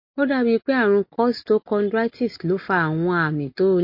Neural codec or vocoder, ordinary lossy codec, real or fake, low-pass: none; MP3, 32 kbps; real; 5.4 kHz